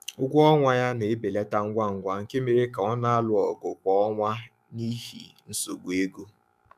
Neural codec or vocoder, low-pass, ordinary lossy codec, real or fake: autoencoder, 48 kHz, 128 numbers a frame, DAC-VAE, trained on Japanese speech; 14.4 kHz; none; fake